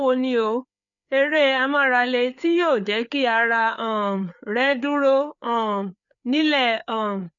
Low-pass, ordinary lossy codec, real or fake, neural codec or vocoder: 7.2 kHz; none; fake; codec, 16 kHz, 4 kbps, FreqCodec, larger model